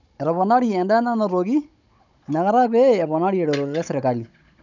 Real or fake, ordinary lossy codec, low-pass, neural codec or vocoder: fake; none; 7.2 kHz; codec, 16 kHz, 16 kbps, FunCodec, trained on Chinese and English, 50 frames a second